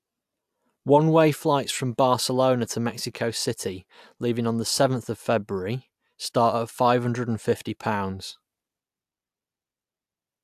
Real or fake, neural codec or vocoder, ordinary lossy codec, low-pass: real; none; AAC, 96 kbps; 14.4 kHz